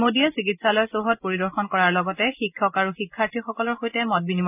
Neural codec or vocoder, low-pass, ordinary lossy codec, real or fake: none; 3.6 kHz; none; real